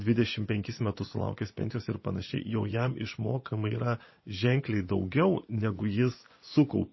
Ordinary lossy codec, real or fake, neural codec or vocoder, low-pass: MP3, 24 kbps; fake; vocoder, 24 kHz, 100 mel bands, Vocos; 7.2 kHz